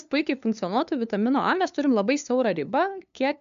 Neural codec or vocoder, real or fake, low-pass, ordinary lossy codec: codec, 16 kHz, 2 kbps, FunCodec, trained on LibriTTS, 25 frames a second; fake; 7.2 kHz; AAC, 96 kbps